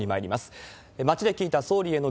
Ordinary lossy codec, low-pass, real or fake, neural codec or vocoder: none; none; real; none